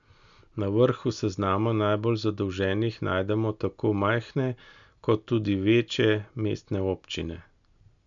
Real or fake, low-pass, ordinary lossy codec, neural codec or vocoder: real; 7.2 kHz; none; none